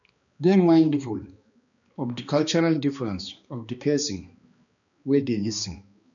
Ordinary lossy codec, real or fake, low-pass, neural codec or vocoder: none; fake; 7.2 kHz; codec, 16 kHz, 2 kbps, X-Codec, HuBERT features, trained on balanced general audio